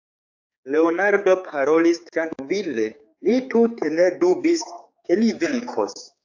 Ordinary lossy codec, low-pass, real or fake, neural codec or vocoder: AAC, 48 kbps; 7.2 kHz; fake; codec, 16 kHz, 4 kbps, X-Codec, HuBERT features, trained on general audio